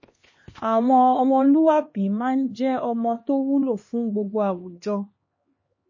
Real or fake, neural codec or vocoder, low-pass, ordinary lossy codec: fake; codec, 16 kHz, 2 kbps, X-Codec, HuBERT features, trained on LibriSpeech; 7.2 kHz; MP3, 32 kbps